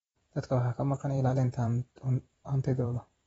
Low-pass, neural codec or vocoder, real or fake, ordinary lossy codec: 19.8 kHz; vocoder, 44.1 kHz, 128 mel bands every 512 samples, BigVGAN v2; fake; AAC, 24 kbps